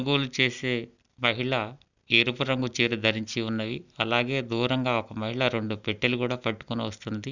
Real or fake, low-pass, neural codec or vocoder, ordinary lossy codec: real; 7.2 kHz; none; none